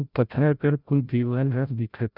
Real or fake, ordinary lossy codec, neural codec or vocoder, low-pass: fake; none; codec, 16 kHz, 0.5 kbps, FreqCodec, larger model; 5.4 kHz